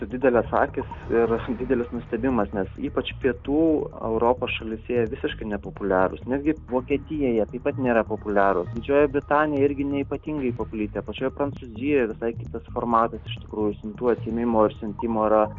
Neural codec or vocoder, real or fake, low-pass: none; real; 7.2 kHz